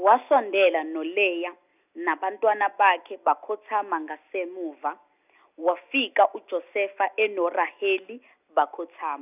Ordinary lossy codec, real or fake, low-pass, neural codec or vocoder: none; real; 3.6 kHz; none